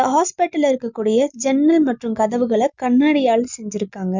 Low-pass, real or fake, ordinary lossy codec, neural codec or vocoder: 7.2 kHz; real; none; none